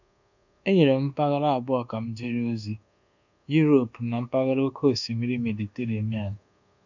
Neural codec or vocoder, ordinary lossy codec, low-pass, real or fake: codec, 24 kHz, 1.2 kbps, DualCodec; none; 7.2 kHz; fake